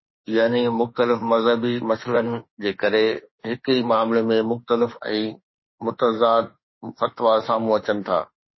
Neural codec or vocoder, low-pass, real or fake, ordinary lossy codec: autoencoder, 48 kHz, 32 numbers a frame, DAC-VAE, trained on Japanese speech; 7.2 kHz; fake; MP3, 24 kbps